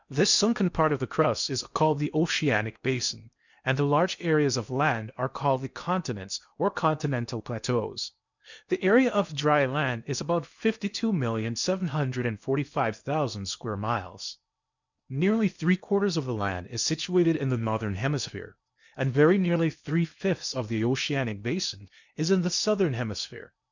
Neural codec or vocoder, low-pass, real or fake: codec, 16 kHz in and 24 kHz out, 0.8 kbps, FocalCodec, streaming, 65536 codes; 7.2 kHz; fake